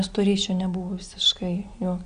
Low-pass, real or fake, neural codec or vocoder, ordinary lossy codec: 9.9 kHz; real; none; Opus, 64 kbps